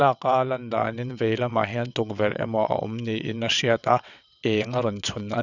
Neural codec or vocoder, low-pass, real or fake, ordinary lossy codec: vocoder, 22.05 kHz, 80 mel bands, WaveNeXt; 7.2 kHz; fake; none